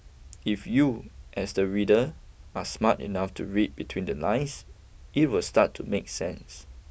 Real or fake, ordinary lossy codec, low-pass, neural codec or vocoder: real; none; none; none